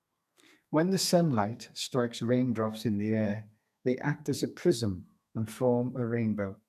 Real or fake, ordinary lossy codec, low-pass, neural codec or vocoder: fake; none; 14.4 kHz; codec, 32 kHz, 1.9 kbps, SNAC